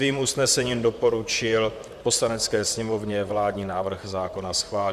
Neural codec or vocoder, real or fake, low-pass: vocoder, 44.1 kHz, 128 mel bands, Pupu-Vocoder; fake; 14.4 kHz